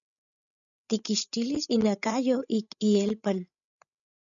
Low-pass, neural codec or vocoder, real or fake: 7.2 kHz; codec, 16 kHz, 16 kbps, FreqCodec, larger model; fake